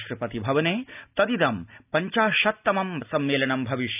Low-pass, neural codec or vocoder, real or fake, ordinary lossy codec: 3.6 kHz; none; real; none